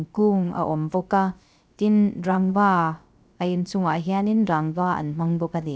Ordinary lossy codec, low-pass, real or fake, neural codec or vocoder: none; none; fake; codec, 16 kHz, 0.3 kbps, FocalCodec